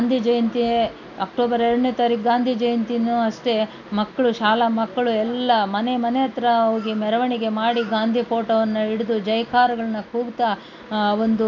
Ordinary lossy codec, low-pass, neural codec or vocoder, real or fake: none; 7.2 kHz; none; real